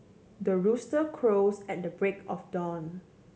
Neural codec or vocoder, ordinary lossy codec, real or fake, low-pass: none; none; real; none